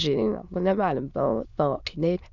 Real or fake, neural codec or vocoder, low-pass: fake; autoencoder, 22.05 kHz, a latent of 192 numbers a frame, VITS, trained on many speakers; 7.2 kHz